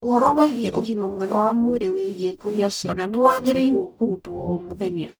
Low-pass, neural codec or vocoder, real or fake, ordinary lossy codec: none; codec, 44.1 kHz, 0.9 kbps, DAC; fake; none